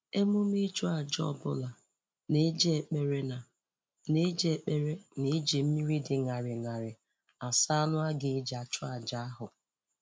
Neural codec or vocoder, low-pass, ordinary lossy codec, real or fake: none; none; none; real